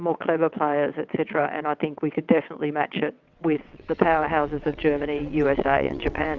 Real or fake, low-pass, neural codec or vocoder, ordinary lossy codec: fake; 7.2 kHz; vocoder, 22.05 kHz, 80 mel bands, WaveNeXt; Opus, 64 kbps